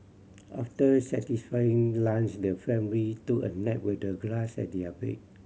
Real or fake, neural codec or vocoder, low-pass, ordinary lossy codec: real; none; none; none